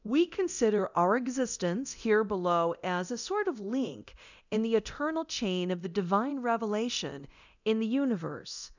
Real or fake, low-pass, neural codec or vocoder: fake; 7.2 kHz; codec, 24 kHz, 0.9 kbps, DualCodec